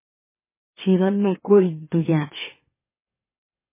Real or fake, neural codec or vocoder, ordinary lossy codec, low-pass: fake; autoencoder, 44.1 kHz, a latent of 192 numbers a frame, MeloTTS; MP3, 16 kbps; 3.6 kHz